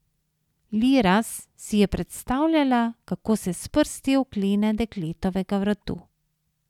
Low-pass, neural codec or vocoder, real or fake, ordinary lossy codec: 19.8 kHz; none; real; none